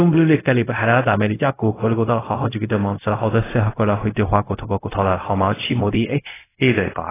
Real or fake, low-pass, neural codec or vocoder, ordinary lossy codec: fake; 3.6 kHz; codec, 16 kHz, 0.4 kbps, LongCat-Audio-Codec; AAC, 16 kbps